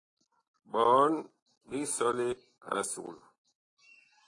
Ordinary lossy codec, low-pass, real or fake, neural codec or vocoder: AAC, 32 kbps; 10.8 kHz; real; none